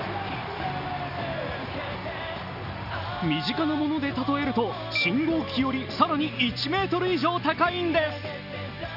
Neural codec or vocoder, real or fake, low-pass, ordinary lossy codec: none; real; 5.4 kHz; none